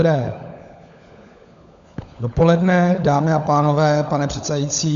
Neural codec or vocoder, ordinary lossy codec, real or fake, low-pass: codec, 16 kHz, 16 kbps, FunCodec, trained on LibriTTS, 50 frames a second; MP3, 64 kbps; fake; 7.2 kHz